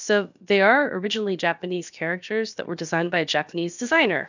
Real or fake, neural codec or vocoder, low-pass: fake; codec, 16 kHz, about 1 kbps, DyCAST, with the encoder's durations; 7.2 kHz